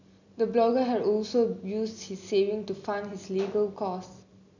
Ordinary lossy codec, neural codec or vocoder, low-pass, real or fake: none; none; 7.2 kHz; real